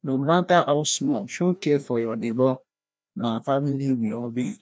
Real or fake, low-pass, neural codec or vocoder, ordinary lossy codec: fake; none; codec, 16 kHz, 1 kbps, FreqCodec, larger model; none